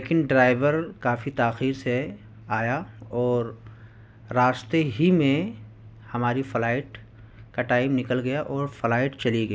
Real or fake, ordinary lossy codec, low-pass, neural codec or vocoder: real; none; none; none